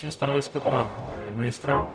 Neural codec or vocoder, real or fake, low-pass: codec, 44.1 kHz, 0.9 kbps, DAC; fake; 9.9 kHz